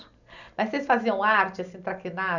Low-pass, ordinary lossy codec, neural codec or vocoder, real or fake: 7.2 kHz; none; none; real